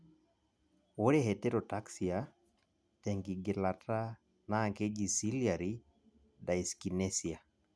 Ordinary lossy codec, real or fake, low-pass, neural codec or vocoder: none; real; none; none